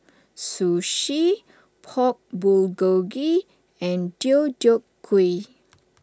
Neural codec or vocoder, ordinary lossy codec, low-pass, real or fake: none; none; none; real